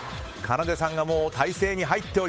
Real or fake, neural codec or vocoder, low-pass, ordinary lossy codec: fake; codec, 16 kHz, 8 kbps, FunCodec, trained on Chinese and English, 25 frames a second; none; none